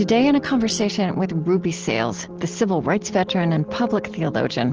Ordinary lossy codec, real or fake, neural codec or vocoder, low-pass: Opus, 16 kbps; real; none; 7.2 kHz